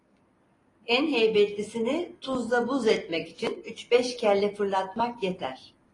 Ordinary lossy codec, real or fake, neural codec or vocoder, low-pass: AAC, 32 kbps; real; none; 10.8 kHz